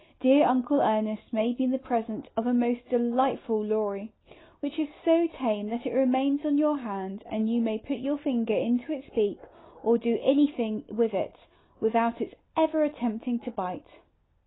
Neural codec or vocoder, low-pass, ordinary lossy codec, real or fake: none; 7.2 kHz; AAC, 16 kbps; real